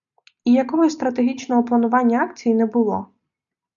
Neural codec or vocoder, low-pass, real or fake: none; 7.2 kHz; real